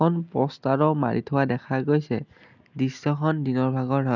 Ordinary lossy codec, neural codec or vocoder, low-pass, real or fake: none; none; 7.2 kHz; real